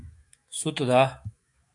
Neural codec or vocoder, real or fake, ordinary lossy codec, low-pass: autoencoder, 48 kHz, 128 numbers a frame, DAC-VAE, trained on Japanese speech; fake; AAC, 64 kbps; 10.8 kHz